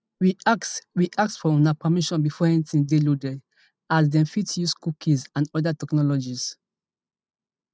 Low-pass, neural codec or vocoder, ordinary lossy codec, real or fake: none; none; none; real